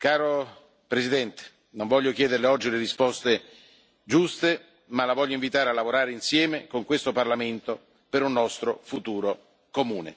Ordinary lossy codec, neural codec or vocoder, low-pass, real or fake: none; none; none; real